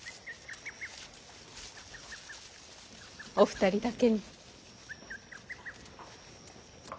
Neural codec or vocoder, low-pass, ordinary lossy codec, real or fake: none; none; none; real